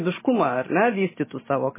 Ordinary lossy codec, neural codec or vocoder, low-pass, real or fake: MP3, 16 kbps; none; 3.6 kHz; real